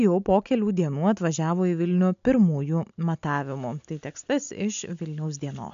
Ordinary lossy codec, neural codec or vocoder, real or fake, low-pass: MP3, 64 kbps; none; real; 7.2 kHz